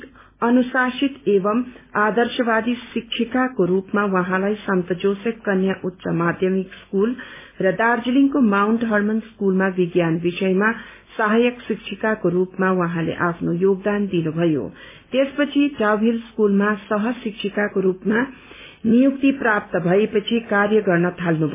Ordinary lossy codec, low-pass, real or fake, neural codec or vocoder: MP3, 16 kbps; 3.6 kHz; real; none